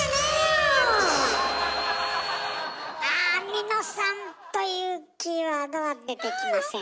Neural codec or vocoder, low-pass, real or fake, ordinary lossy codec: none; none; real; none